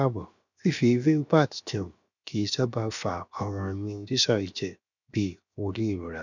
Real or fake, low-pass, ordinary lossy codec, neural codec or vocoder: fake; 7.2 kHz; none; codec, 16 kHz, 0.7 kbps, FocalCodec